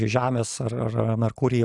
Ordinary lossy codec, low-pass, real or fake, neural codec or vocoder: AAC, 64 kbps; 10.8 kHz; real; none